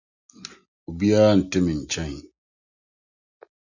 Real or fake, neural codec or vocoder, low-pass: real; none; 7.2 kHz